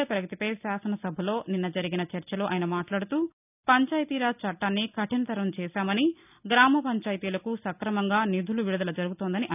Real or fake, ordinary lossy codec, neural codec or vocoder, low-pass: real; none; none; 3.6 kHz